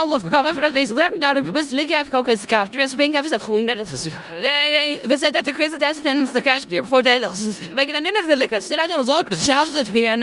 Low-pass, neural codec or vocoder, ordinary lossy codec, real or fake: 10.8 kHz; codec, 16 kHz in and 24 kHz out, 0.4 kbps, LongCat-Audio-Codec, four codebook decoder; Opus, 64 kbps; fake